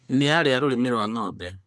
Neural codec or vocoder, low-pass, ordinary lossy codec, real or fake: codec, 24 kHz, 1 kbps, SNAC; none; none; fake